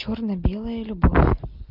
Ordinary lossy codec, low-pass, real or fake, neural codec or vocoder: Opus, 32 kbps; 5.4 kHz; real; none